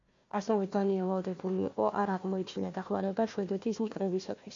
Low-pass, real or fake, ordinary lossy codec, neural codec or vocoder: 7.2 kHz; fake; MP3, 48 kbps; codec, 16 kHz, 1 kbps, FunCodec, trained on Chinese and English, 50 frames a second